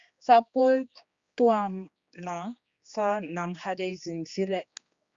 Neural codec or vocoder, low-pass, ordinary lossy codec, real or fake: codec, 16 kHz, 2 kbps, X-Codec, HuBERT features, trained on general audio; 7.2 kHz; Opus, 64 kbps; fake